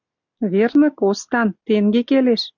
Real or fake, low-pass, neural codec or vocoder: real; 7.2 kHz; none